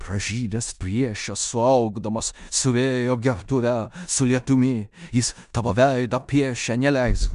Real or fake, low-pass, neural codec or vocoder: fake; 10.8 kHz; codec, 16 kHz in and 24 kHz out, 0.9 kbps, LongCat-Audio-Codec, four codebook decoder